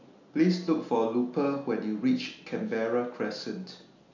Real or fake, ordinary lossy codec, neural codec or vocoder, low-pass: real; none; none; 7.2 kHz